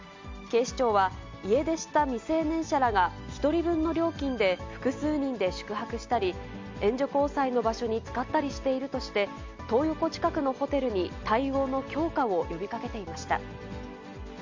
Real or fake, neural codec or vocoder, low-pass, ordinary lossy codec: real; none; 7.2 kHz; MP3, 64 kbps